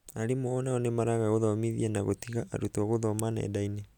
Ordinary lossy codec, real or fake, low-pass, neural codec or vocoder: none; real; 19.8 kHz; none